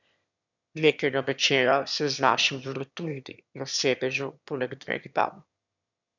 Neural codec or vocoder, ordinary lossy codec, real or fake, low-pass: autoencoder, 22.05 kHz, a latent of 192 numbers a frame, VITS, trained on one speaker; none; fake; 7.2 kHz